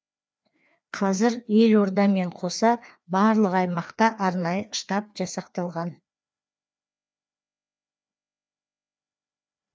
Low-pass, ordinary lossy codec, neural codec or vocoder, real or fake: none; none; codec, 16 kHz, 2 kbps, FreqCodec, larger model; fake